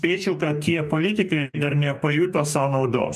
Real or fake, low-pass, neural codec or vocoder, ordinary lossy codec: fake; 14.4 kHz; codec, 44.1 kHz, 2.6 kbps, DAC; MP3, 96 kbps